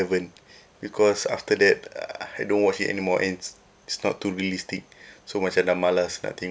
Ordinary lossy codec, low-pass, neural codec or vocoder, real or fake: none; none; none; real